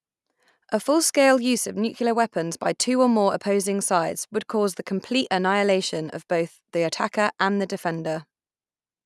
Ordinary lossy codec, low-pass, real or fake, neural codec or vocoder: none; none; real; none